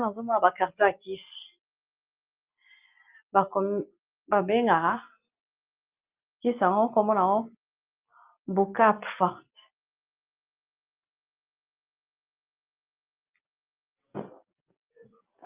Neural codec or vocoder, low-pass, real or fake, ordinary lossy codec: none; 3.6 kHz; real; Opus, 24 kbps